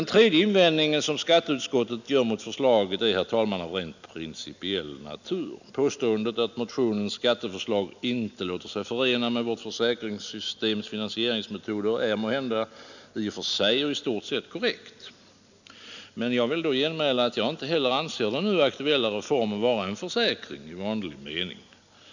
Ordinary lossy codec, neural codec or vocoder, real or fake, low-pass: none; none; real; 7.2 kHz